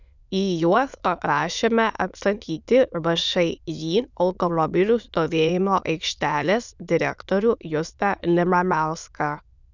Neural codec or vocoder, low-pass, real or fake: autoencoder, 22.05 kHz, a latent of 192 numbers a frame, VITS, trained on many speakers; 7.2 kHz; fake